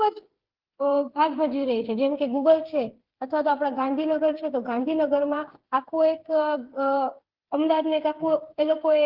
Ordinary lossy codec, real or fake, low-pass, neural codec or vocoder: Opus, 16 kbps; fake; 5.4 kHz; codec, 16 kHz, 8 kbps, FreqCodec, smaller model